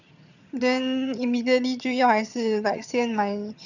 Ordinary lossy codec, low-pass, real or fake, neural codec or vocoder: none; 7.2 kHz; fake; vocoder, 22.05 kHz, 80 mel bands, HiFi-GAN